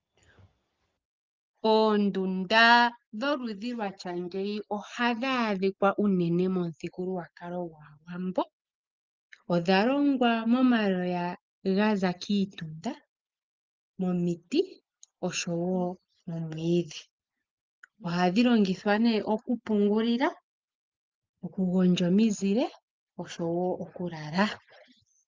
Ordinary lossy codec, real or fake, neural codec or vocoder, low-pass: Opus, 24 kbps; real; none; 7.2 kHz